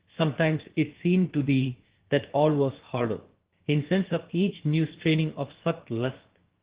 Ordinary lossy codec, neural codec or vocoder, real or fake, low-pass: Opus, 16 kbps; codec, 16 kHz, about 1 kbps, DyCAST, with the encoder's durations; fake; 3.6 kHz